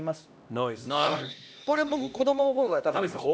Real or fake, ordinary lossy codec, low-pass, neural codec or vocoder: fake; none; none; codec, 16 kHz, 1 kbps, X-Codec, HuBERT features, trained on LibriSpeech